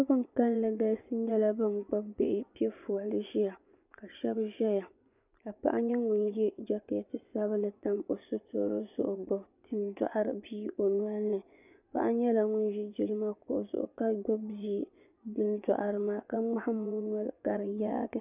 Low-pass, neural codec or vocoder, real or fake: 3.6 kHz; vocoder, 24 kHz, 100 mel bands, Vocos; fake